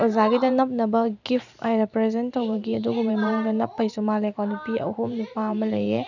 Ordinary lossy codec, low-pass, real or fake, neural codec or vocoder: none; 7.2 kHz; fake; vocoder, 44.1 kHz, 128 mel bands every 512 samples, BigVGAN v2